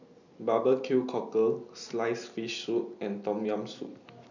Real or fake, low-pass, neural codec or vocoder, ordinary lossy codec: real; 7.2 kHz; none; none